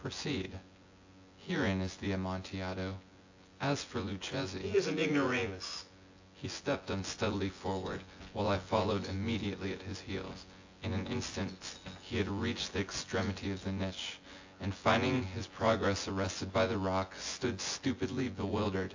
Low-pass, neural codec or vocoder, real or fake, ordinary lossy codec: 7.2 kHz; vocoder, 24 kHz, 100 mel bands, Vocos; fake; AAC, 48 kbps